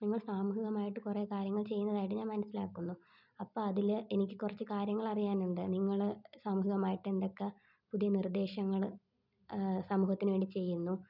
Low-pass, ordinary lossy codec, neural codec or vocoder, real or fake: 5.4 kHz; none; none; real